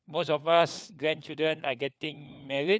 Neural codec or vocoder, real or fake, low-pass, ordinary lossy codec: codec, 16 kHz, 4 kbps, FunCodec, trained on LibriTTS, 50 frames a second; fake; none; none